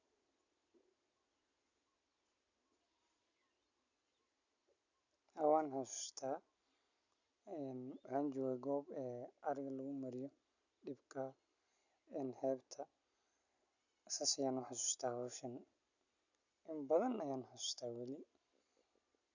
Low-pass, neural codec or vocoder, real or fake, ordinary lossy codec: 7.2 kHz; none; real; none